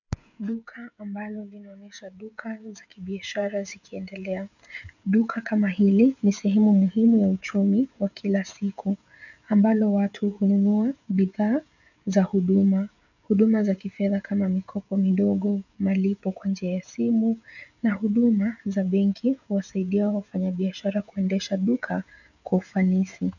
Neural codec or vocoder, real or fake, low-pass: codec, 16 kHz, 16 kbps, FreqCodec, smaller model; fake; 7.2 kHz